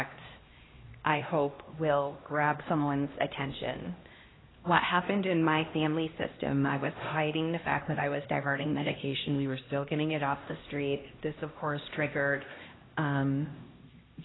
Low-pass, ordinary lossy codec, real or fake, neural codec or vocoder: 7.2 kHz; AAC, 16 kbps; fake; codec, 16 kHz, 1 kbps, X-Codec, HuBERT features, trained on LibriSpeech